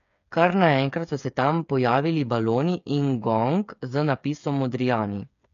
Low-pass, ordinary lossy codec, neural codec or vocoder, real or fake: 7.2 kHz; none; codec, 16 kHz, 8 kbps, FreqCodec, smaller model; fake